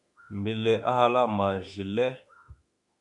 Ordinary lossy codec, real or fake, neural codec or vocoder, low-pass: MP3, 96 kbps; fake; autoencoder, 48 kHz, 32 numbers a frame, DAC-VAE, trained on Japanese speech; 10.8 kHz